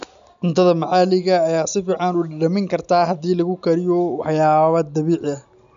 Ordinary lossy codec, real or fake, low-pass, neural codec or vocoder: none; real; 7.2 kHz; none